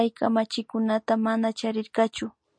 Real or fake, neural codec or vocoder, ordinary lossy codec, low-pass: real; none; MP3, 48 kbps; 9.9 kHz